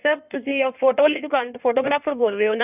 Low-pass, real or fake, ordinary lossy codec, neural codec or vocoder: 3.6 kHz; fake; none; codec, 16 kHz in and 24 kHz out, 1.1 kbps, FireRedTTS-2 codec